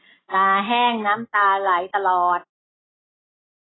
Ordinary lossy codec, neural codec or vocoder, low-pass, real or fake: AAC, 16 kbps; none; 7.2 kHz; real